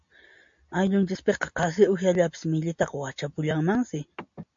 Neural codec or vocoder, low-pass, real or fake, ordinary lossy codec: none; 7.2 kHz; real; MP3, 48 kbps